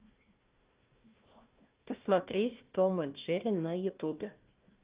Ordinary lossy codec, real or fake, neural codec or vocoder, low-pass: Opus, 32 kbps; fake; codec, 16 kHz, 1 kbps, FunCodec, trained on Chinese and English, 50 frames a second; 3.6 kHz